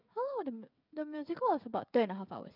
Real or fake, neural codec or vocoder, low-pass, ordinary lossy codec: fake; codec, 16 kHz, 16 kbps, FreqCodec, smaller model; 5.4 kHz; none